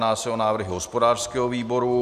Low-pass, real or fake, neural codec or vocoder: 14.4 kHz; real; none